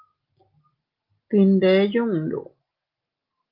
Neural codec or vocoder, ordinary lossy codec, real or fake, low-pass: none; Opus, 32 kbps; real; 5.4 kHz